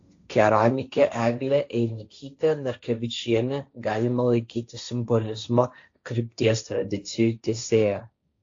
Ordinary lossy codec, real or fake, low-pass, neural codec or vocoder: AAC, 48 kbps; fake; 7.2 kHz; codec, 16 kHz, 1.1 kbps, Voila-Tokenizer